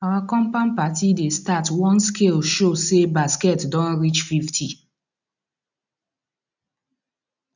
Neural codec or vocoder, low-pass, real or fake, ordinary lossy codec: none; 7.2 kHz; real; none